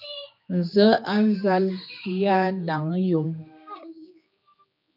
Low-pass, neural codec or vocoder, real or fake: 5.4 kHz; codec, 16 kHz, 2 kbps, X-Codec, HuBERT features, trained on general audio; fake